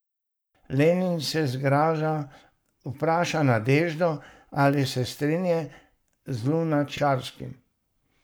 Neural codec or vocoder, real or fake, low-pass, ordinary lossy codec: codec, 44.1 kHz, 7.8 kbps, Pupu-Codec; fake; none; none